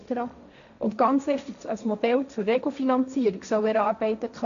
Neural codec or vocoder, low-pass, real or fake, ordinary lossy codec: codec, 16 kHz, 1.1 kbps, Voila-Tokenizer; 7.2 kHz; fake; none